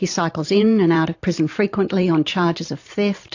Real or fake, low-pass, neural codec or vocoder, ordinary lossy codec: fake; 7.2 kHz; vocoder, 44.1 kHz, 128 mel bands every 512 samples, BigVGAN v2; AAC, 48 kbps